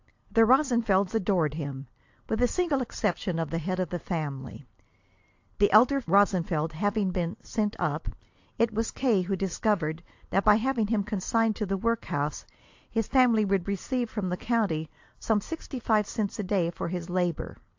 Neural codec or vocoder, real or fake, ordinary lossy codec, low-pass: none; real; AAC, 48 kbps; 7.2 kHz